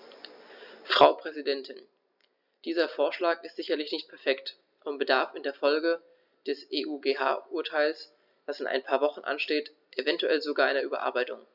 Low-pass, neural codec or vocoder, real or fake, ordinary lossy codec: 5.4 kHz; none; real; none